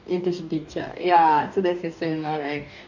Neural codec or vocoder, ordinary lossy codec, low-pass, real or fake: codec, 44.1 kHz, 2.6 kbps, DAC; none; 7.2 kHz; fake